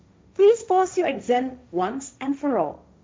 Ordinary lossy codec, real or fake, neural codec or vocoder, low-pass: none; fake; codec, 16 kHz, 1.1 kbps, Voila-Tokenizer; none